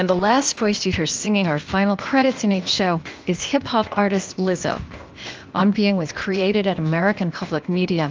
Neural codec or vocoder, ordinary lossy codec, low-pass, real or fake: codec, 16 kHz, 0.8 kbps, ZipCodec; Opus, 24 kbps; 7.2 kHz; fake